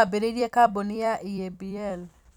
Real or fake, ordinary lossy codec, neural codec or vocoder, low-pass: fake; none; vocoder, 44.1 kHz, 128 mel bands every 256 samples, BigVGAN v2; 19.8 kHz